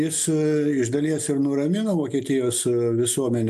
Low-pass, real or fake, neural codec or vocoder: 14.4 kHz; real; none